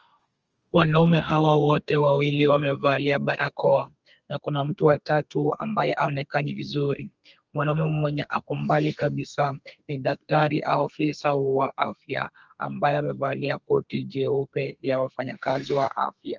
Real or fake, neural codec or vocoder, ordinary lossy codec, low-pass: fake; codec, 32 kHz, 1.9 kbps, SNAC; Opus, 24 kbps; 7.2 kHz